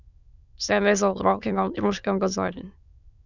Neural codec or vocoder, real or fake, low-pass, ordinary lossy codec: autoencoder, 22.05 kHz, a latent of 192 numbers a frame, VITS, trained on many speakers; fake; 7.2 kHz; none